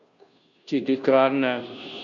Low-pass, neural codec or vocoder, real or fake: 7.2 kHz; codec, 16 kHz, 0.5 kbps, FunCodec, trained on Chinese and English, 25 frames a second; fake